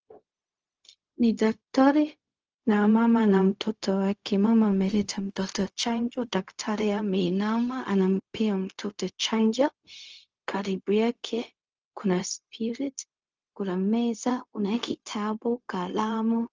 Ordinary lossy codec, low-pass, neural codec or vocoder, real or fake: Opus, 24 kbps; 7.2 kHz; codec, 16 kHz, 0.4 kbps, LongCat-Audio-Codec; fake